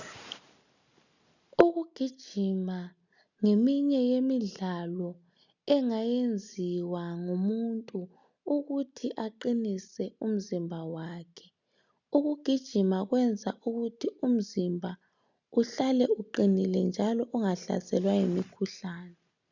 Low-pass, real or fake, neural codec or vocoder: 7.2 kHz; real; none